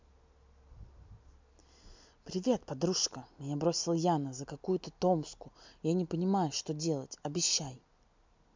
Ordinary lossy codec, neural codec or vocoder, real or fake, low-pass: MP3, 64 kbps; none; real; 7.2 kHz